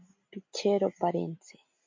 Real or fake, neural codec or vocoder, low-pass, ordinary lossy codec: real; none; 7.2 kHz; MP3, 48 kbps